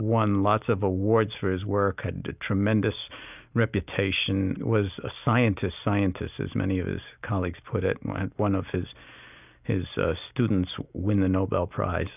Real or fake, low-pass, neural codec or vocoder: real; 3.6 kHz; none